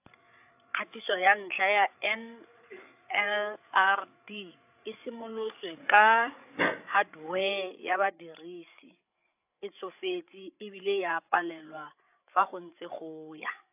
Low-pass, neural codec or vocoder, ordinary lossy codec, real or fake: 3.6 kHz; codec, 16 kHz, 8 kbps, FreqCodec, larger model; AAC, 32 kbps; fake